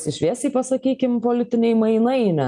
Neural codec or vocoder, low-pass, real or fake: none; 10.8 kHz; real